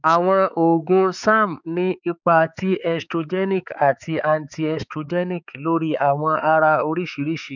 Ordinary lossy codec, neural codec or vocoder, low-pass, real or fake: none; codec, 16 kHz, 4 kbps, X-Codec, HuBERT features, trained on balanced general audio; 7.2 kHz; fake